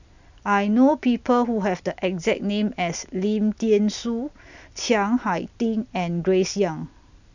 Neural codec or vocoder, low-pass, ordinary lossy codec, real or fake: none; 7.2 kHz; none; real